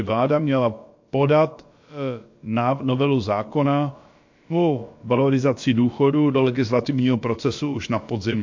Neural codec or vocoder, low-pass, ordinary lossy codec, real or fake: codec, 16 kHz, about 1 kbps, DyCAST, with the encoder's durations; 7.2 kHz; MP3, 48 kbps; fake